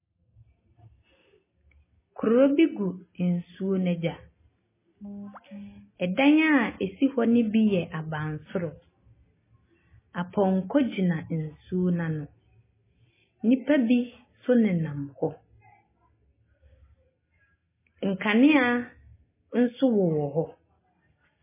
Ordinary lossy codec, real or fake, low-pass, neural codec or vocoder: MP3, 16 kbps; real; 3.6 kHz; none